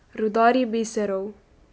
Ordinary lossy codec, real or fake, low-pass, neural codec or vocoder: none; real; none; none